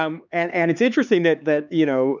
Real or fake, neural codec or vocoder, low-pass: fake; codec, 16 kHz, 4 kbps, X-Codec, HuBERT features, trained on LibriSpeech; 7.2 kHz